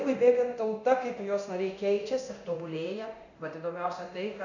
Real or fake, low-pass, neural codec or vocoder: fake; 7.2 kHz; codec, 24 kHz, 0.9 kbps, DualCodec